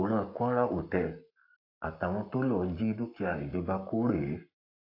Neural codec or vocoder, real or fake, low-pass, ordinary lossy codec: codec, 44.1 kHz, 7.8 kbps, Pupu-Codec; fake; 5.4 kHz; AAC, 32 kbps